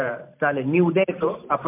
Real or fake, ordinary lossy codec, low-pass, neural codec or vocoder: fake; MP3, 32 kbps; 3.6 kHz; vocoder, 44.1 kHz, 128 mel bands every 512 samples, BigVGAN v2